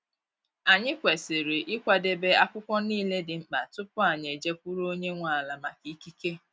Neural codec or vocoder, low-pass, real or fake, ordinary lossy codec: none; none; real; none